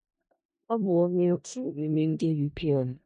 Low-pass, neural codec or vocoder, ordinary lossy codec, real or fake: 10.8 kHz; codec, 16 kHz in and 24 kHz out, 0.4 kbps, LongCat-Audio-Codec, four codebook decoder; none; fake